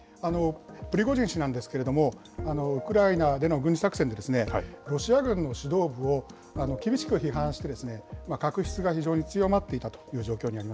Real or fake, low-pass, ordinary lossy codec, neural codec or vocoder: real; none; none; none